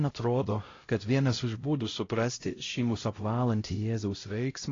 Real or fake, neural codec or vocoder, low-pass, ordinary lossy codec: fake; codec, 16 kHz, 0.5 kbps, X-Codec, HuBERT features, trained on LibriSpeech; 7.2 kHz; AAC, 32 kbps